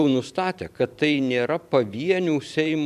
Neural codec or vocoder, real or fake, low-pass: vocoder, 44.1 kHz, 128 mel bands every 512 samples, BigVGAN v2; fake; 14.4 kHz